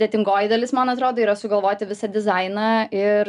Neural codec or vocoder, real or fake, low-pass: none; real; 10.8 kHz